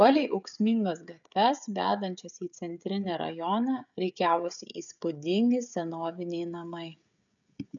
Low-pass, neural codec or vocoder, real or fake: 7.2 kHz; codec, 16 kHz, 16 kbps, FreqCodec, smaller model; fake